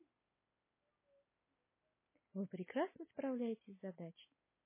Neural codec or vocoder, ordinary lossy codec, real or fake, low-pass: none; MP3, 16 kbps; real; 3.6 kHz